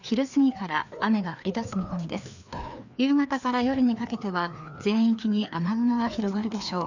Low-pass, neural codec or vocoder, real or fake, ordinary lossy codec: 7.2 kHz; codec, 16 kHz, 2 kbps, FreqCodec, larger model; fake; none